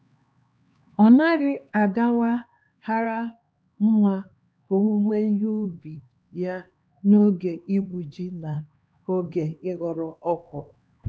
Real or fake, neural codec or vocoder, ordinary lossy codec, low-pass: fake; codec, 16 kHz, 2 kbps, X-Codec, HuBERT features, trained on LibriSpeech; none; none